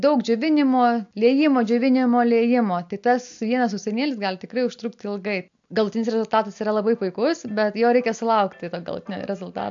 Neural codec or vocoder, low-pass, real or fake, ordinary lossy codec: none; 7.2 kHz; real; AAC, 64 kbps